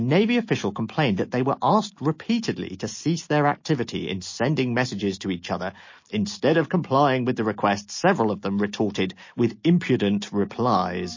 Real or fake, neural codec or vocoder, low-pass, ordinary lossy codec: real; none; 7.2 kHz; MP3, 32 kbps